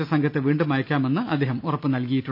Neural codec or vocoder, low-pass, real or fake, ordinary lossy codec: none; 5.4 kHz; real; none